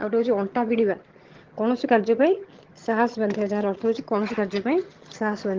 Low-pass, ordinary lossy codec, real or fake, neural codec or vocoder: 7.2 kHz; Opus, 16 kbps; fake; vocoder, 22.05 kHz, 80 mel bands, HiFi-GAN